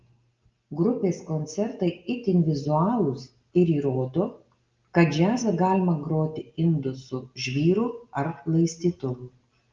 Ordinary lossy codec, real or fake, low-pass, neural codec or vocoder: Opus, 24 kbps; real; 7.2 kHz; none